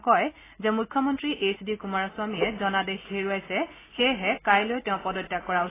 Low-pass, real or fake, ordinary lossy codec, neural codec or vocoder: 3.6 kHz; real; AAC, 16 kbps; none